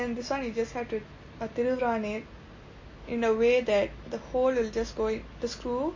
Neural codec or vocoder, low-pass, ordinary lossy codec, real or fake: none; 7.2 kHz; MP3, 32 kbps; real